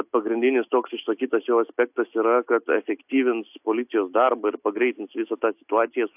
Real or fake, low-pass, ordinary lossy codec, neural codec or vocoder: real; 3.6 kHz; AAC, 32 kbps; none